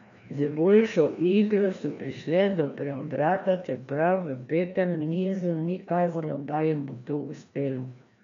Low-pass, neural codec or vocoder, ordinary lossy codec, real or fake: 7.2 kHz; codec, 16 kHz, 1 kbps, FreqCodec, larger model; MP3, 64 kbps; fake